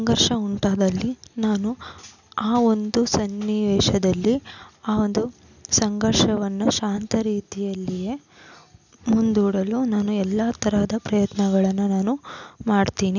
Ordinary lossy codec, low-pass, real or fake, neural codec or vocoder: none; 7.2 kHz; real; none